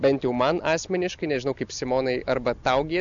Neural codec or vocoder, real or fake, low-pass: none; real; 7.2 kHz